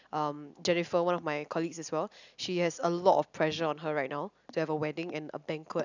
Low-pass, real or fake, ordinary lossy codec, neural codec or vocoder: 7.2 kHz; real; none; none